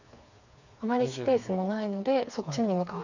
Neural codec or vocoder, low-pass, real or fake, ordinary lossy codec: codec, 16 kHz, 4 kbps, FreqCodec, smaller model; 7.2 kHz; fake; none